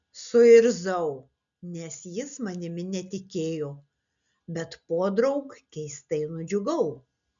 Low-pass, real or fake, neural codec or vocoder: 7.2 kHz; real; none